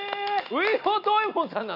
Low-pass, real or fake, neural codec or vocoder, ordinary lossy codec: 5.4 kHz; real; none; none